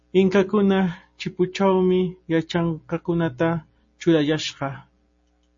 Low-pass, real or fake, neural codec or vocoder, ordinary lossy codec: 7.2 kHz; real; none; MP3, 32 kbps